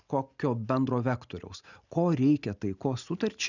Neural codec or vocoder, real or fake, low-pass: none; real; 7.2 kHz